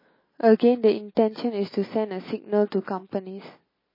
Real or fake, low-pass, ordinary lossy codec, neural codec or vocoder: real; 5.4 kHz; MP3, 24 kbps; none